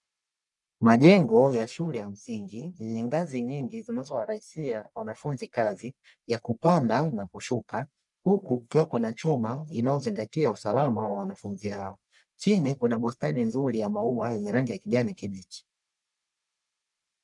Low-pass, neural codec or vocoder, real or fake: 10.8 kHz; codec, 44.1 kHz, 1.7 kbps, Pupu-Codec; fake